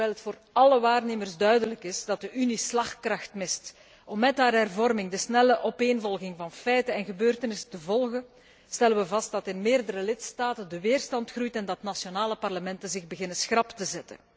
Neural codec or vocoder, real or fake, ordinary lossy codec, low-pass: none; real; none; none